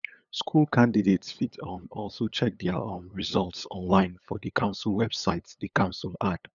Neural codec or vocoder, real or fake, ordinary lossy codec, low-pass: codec, 16 kHz, 8 kbps, FunCodec, trained on LibriTTS, 25 frames a second; fake; none; 7.2 kHz